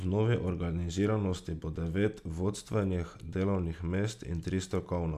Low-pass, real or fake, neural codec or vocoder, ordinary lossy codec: 14.4 kHz; real; none; none